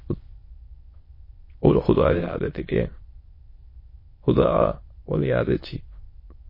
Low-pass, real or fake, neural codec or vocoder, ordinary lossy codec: 5.4 kHz; fake; autoencoder, 22.05 kHz, a latent of 192 numbers a frame, VITS, trained on many speakers; MP3, 24 kbps